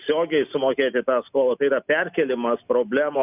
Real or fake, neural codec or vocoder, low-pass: real; none; 3.6 kHz